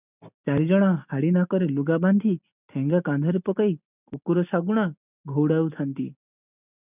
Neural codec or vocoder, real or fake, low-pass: none; real; 3.6 kHz